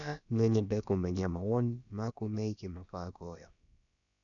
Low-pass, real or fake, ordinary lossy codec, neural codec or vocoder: 7.2 kHz; fake; none; codec, 16 kHz, about 1 kbps, DyCAST, with the encoder's durations